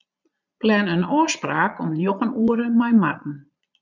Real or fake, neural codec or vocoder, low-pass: fake; vocoder, 22.05 kHz, 80 mel bands, Vocos; 7.2 kHz